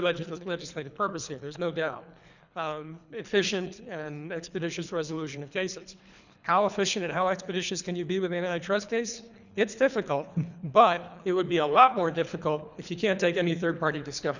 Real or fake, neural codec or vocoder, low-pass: fake; codec, 24 kHz, 3 kbps, HILCodec; 7.2 kHz